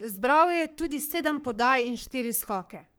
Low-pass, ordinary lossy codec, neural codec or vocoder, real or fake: none; none; codec, 44.1 kHz, 3.4 kbps, Pupu-Codec; fake